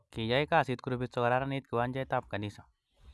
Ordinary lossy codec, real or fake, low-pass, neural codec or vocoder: none; real; none; none